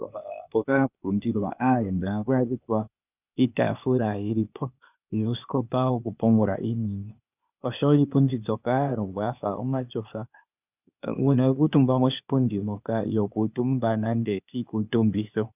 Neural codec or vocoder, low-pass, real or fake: codec, 16 kHz, 0.8 kbps, ZipCodec; 3.6 kHz; fake